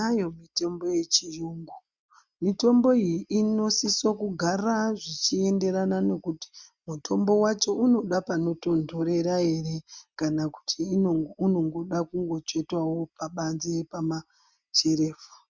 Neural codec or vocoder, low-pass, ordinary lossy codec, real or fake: none; 7.2 kHz; Opus, 64 kbps; real